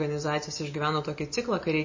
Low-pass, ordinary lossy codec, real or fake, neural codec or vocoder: 7.2 kHz; MP3, 32 kbps; real; none